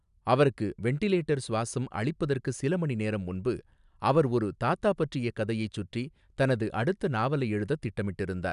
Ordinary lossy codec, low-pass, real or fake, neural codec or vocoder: none; 10.8 kHz; real; none